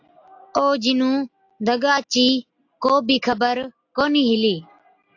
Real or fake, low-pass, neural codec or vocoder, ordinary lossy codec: real; 7.2 kHz; none; AAC, 48 kbps